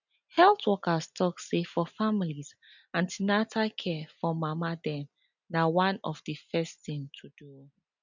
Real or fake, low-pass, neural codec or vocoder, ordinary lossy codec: real; 7.2 kHz; none; none